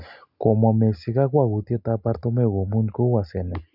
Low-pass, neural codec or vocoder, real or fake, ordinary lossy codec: 5.4 kHz; none; real; none